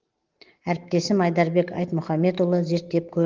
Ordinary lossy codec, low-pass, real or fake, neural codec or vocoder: Opus, 16 kbps; 7.2 kHz; real; none